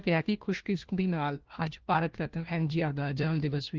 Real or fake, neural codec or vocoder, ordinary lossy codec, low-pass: fake; codec, 16 kHz, 1 kbps, FunCodec, trained on LibriTTS, 50 frames a second; Opus, 16 kbps; 7.2 kHz